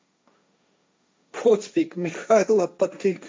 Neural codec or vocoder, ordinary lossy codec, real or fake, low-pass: codec, 16 kHz, 1.1 kbps, Voila-Tokenizer; none; fake; none